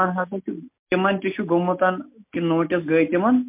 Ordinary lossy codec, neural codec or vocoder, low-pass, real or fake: MP3, 32 kbps; none; 3.6 kHz; real